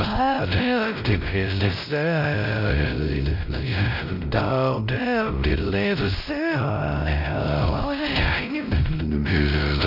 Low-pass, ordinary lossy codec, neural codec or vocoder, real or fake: 5.4 kHz; none; codec, 16 kHz, 0.5 kbps, X-Codec, WavLM features, trained on Multilingual LibriSpeech; fake